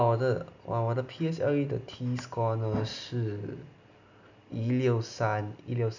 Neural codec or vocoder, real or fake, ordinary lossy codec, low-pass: none; real; none; 7.2 kHz